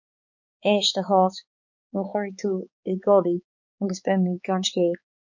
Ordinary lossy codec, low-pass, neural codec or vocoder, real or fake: MP3, 48 kbps; 7.2 kHz; codec, 16 kHz, 4 kbps, X-Codec, HuBERT features, trained on balanced general audio; fake